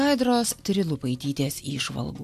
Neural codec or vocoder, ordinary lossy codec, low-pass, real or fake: none; AAC, 96 kbps; 14.4 kHz; real